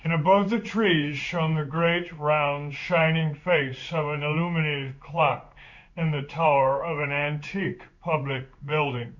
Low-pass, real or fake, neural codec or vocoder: 7.2 kHz; fake; codec, 16 kHz in and 24 kHz out, 1 kbps, XY-Tokenizer